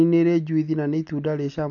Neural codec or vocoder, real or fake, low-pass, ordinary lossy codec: none; real; 7.2 kHz; none